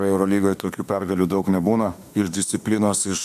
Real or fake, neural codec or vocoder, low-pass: fake; autoencoder, 48 kHz, 32 numbers a frame, DAC-VAE, trained on Japanese speech; 14.4 kHz